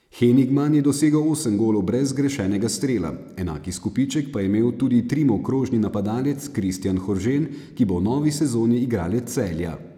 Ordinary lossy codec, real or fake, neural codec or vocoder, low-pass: none; real; none; 19.8 kHz